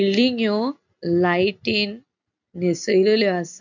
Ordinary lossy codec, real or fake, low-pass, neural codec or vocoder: none; real; 7.2 kHz; none